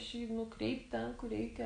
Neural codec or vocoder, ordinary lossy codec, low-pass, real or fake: none; AAC, 48 kbps; 9.9 kHz; real